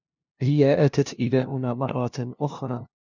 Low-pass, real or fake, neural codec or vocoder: 7.2 kHz; fake; codec, 16 kHz, 0.5 kbps, FunCodec, trained on LibriTTS, 25 frames a second